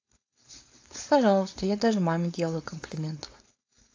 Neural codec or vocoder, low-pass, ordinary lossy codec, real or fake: codec, 16 kHz, 4.8 kbps, FACodec; 7.2 kHz; AAC, 48 kbps; fake